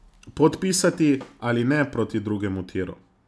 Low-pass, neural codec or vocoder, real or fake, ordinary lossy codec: none; none; real; none